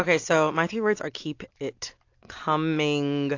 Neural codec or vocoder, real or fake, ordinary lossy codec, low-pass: none; real; AAC, 48 kbps; 7.2 kHz